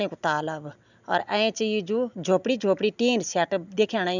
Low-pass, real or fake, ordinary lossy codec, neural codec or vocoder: 7.2 kHz; real; none; none